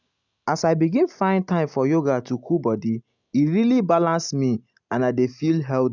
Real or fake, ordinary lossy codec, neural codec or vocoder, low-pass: real; none; none; 7.2 kHz